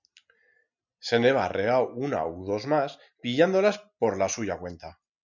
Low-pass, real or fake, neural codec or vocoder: 7.2 kHz; real; none